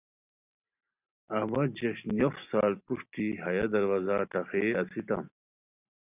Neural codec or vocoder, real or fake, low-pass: none; real; 3.6 kHz